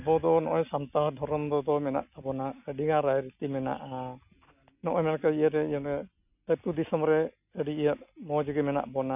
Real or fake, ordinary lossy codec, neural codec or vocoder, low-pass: real; none; none; 3.6 kHz